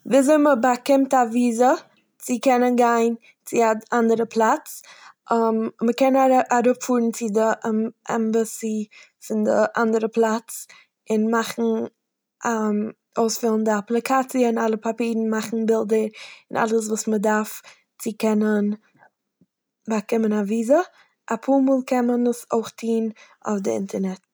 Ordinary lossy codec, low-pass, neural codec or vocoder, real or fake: none; none; none; real